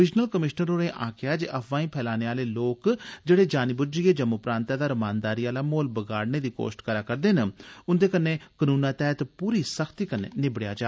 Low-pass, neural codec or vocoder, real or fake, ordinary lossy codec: none; none; real; none